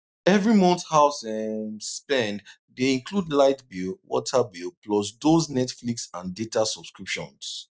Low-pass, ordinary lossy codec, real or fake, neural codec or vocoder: none; none; real; none